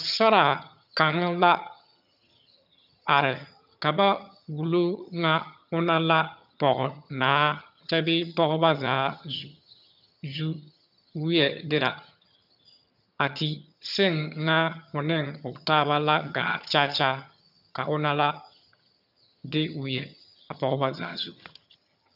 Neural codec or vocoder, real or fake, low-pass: vocoder, 22.05 kHz, 80 mel bands, HiFi-GAN; fake; 5.4 kHz